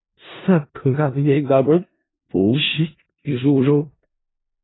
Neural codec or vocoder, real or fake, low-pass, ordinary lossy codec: codec, 16 kHz in and 24 kHz out, 0.4 kbps, LongCat-Audio-Codec, four codebook decoder; fake; 7.2 kHz; AAC, 16 kbps